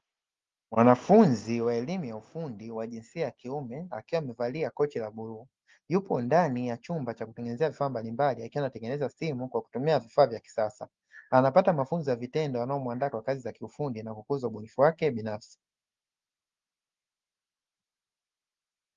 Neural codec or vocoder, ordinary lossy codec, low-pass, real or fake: none; Opus, 32 kbps; 7.2 kHz; real